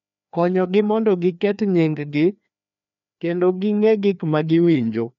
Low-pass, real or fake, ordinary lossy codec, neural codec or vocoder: 7.2 kHz; fake; none; codec, 16 kHz, 2 kbps, FreqCodec, larger model